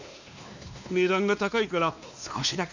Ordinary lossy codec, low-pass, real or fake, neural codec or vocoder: none; 7.2 kHz; fake; codec, 16 kHz, 2 kbps, X-Codec, WavLM features, trained on Multilingual LibriSpeech